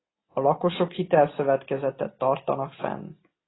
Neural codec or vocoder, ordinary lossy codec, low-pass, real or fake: none; AAC, 16 kbps; 7.2 kHz; real